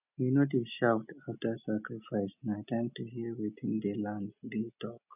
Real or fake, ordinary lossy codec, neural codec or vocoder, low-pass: real; none; none; 3.6 kHz